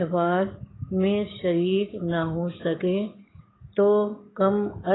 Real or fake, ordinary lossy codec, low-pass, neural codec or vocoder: fake; AAC, 16 kbps; 7.2 kHz; codec, 44.1 kHz, 7.8 kbps, DAC